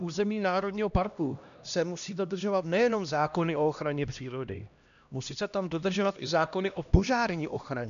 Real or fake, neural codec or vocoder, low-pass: fake; codec, 16 kHz, 1 kbps, X-Codec, HuBERT features, trained on LibriSpeech; 7.2 kHz